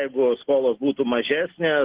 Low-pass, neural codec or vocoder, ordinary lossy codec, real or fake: 3.6 kHz; none; Opus, 16 kbps; real